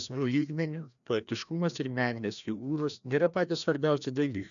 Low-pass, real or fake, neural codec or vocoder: 7.2 kHz; fake; codec, 16 kHz, 1 kbps, FreqCodec, larger model